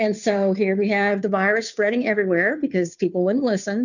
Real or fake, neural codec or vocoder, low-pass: fake; codec, 16 kHz, 2 kbps, FunCodec, trained on Chinese and English, 25 frames a second; 7.2 kHz